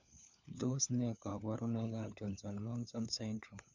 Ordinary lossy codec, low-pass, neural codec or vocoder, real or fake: none; 7.2 kHz; codec, 16 kHz, 4 kbps, FunCodec, trained on LibriTTS, 50 frames a second; fake